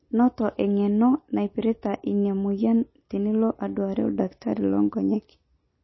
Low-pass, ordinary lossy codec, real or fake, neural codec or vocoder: 7.2 kHz; MP3, 24 kbps; real; none